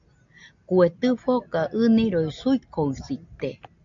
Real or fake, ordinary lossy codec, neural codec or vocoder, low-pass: real; AAC, 64 kbps; none; 7.2 kHz